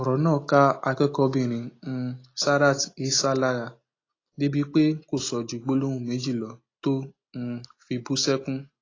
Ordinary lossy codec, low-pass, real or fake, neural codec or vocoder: AAC, 32 kbps; 7.2 kHz; real; none